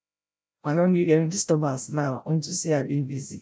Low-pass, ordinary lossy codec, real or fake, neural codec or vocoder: none; none; fake; codec, 16 kHz, 0.5 kbps, FreqCodec, larger model